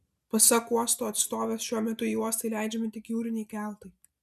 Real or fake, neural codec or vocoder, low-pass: real; none; 14.4 kHz